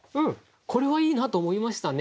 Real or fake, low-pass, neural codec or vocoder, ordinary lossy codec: real; none; none; none